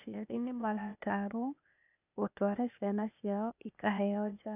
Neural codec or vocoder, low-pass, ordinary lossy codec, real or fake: codec, 16 kHz, 0.8 kbps, ZipCodec; 3.6 kHz; none; fake